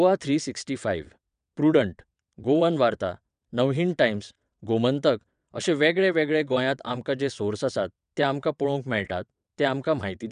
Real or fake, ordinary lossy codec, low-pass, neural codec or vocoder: fake; none; 9.9 kHz; vocoder, 22.05 kHz, 80 mel bands, WaveNeXt